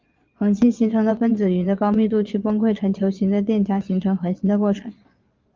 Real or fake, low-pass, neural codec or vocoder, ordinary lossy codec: fake; 7.2 kHz; vocoder, 22.05 kHz, 80 mel bands, Vocos; Opus, 32 kbps